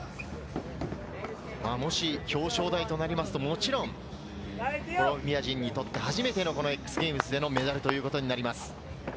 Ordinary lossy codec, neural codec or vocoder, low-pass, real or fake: none; none; none; real